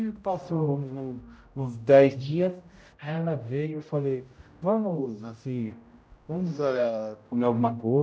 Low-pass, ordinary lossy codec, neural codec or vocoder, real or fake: none; none; codec, 16 kHz, 0.5 kbps, X-Codec, HuBERT features, trained on general audio; fake